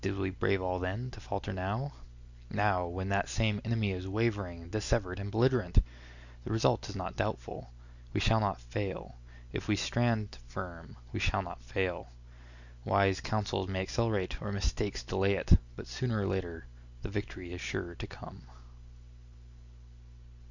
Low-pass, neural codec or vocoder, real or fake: 7.2 kHz; none; real